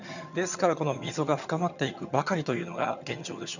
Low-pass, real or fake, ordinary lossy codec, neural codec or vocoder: 7.2 kHz; fake; none; vocoder, 22.05 kHz, 80 mel bands, HiFi-GAN